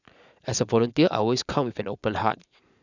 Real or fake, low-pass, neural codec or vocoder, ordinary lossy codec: fake; 7.2 kHz; vocoder, 44.1 kHz, 80 mel bands, Vocos; none